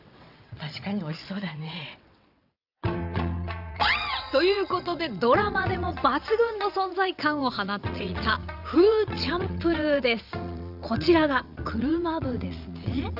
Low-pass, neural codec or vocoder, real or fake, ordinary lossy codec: 5.4 kHz; vocoder, 22.05 kHz, 80 mel bands, WaveNeXt; fake; none